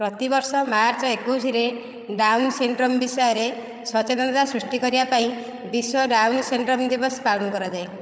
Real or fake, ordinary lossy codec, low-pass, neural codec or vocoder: fake; none; none; codec, 16 kHz, 8 kbps, FreqCodec, larger model